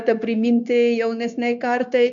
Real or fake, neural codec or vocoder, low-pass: fake; codec, 16 kHz, 0.9 kbps, LongCat-Audio-Codec; 7.2 kHz